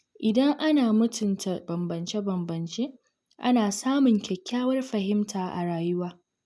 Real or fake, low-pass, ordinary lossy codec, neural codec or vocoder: real; none; none; none